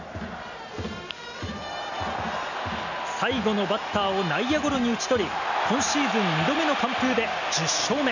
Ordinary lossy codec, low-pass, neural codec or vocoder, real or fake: none; 7.2 kHz; none; real